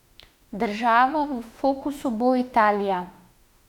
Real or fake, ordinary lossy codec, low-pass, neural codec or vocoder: fake; none; 19.8 kHz; autoencoder, 48 kHz, 32 numbers a frame, DAC-VAE, trained on Japanese speech